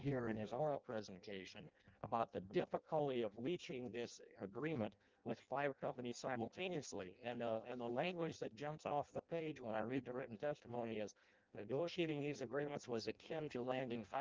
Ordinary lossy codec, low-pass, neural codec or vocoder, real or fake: Opus, 32 kbps; 7.2 kHz; codec, 16 kHz in and 24 kHz out, 0.6 kbps, FireRedTTS-2 codec; fake